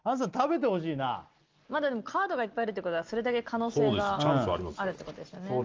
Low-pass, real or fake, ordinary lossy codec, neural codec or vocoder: 7.2 kHz; real; Opus, 32 kbps; none